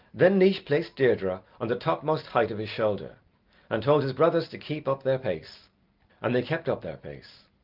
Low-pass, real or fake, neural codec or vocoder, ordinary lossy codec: 5.4 kHz; real; none; Opus, 24 kbps